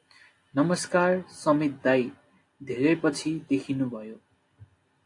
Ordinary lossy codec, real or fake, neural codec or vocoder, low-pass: AAC, 48 kbps; real; none; 10.8 kHz